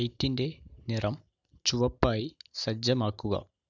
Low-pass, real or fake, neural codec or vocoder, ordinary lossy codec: 7.2 kHz; real; none; none